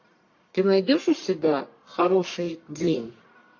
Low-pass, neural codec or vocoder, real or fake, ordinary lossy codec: 7.2 kHz; codec, 44.1 kHz, 1.7 kbps, Pupu-Codec; fake; AAC, 48 kbps